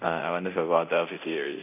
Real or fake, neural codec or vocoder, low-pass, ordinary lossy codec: fake; codec, 24 kHz, 0.9 kbps, DualCodec; 3.6 kHz; none